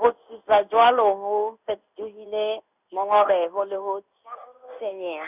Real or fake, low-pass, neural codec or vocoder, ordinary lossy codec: fake; 3.6 kHz; codec, 16 kHz in and 24 kHz out, 1 kbps, XY-Tokenizer; none